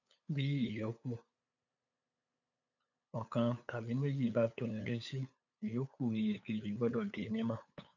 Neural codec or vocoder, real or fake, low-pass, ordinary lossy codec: codec, 16 kHz, 8 kbps, FunCodec, trained on LibriTTS, 25 frames a second; fake; 7.2 kHz; none